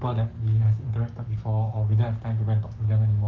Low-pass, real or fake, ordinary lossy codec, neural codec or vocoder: 7.2 kHz; fake; Opus, 24 kbps; codec, 44.1 kHz, 7.8 kbps, Pupu-Codec